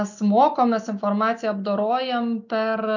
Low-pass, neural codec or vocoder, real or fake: 7.2 kHz; none; real